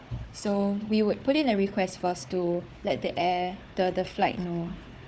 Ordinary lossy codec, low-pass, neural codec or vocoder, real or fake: none; none; codec, 16 kHz, 4 kbps, FunCodec, trained on Chinese and English, 50 frames a second; fake